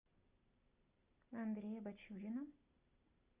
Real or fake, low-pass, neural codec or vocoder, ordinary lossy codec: real; 3.6 kHz; none; Opus, 64 kbps